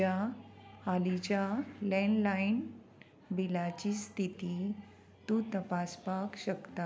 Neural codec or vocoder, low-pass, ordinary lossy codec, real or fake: none; none; none; real